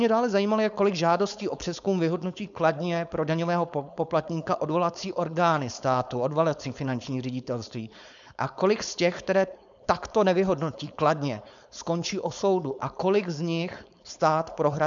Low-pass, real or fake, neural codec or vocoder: 7.2 kHz; fake; codec, 16 kHz, 4.8 kbps, FACodec